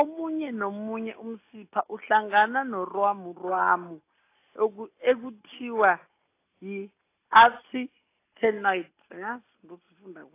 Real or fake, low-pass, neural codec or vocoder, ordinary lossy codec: real; 3.6 kHz; none; AAC, 24 kbps